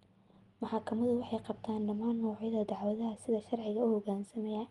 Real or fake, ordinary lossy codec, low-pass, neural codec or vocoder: real; Opus, 32 kbps; 10.8 kHz; none